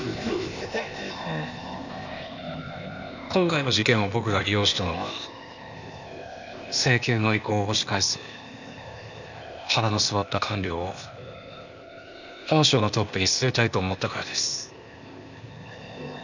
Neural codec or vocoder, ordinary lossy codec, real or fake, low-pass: codec, 16 kHz, 0.8 kbps, ZipCodec; none; fake; 7.2 kHz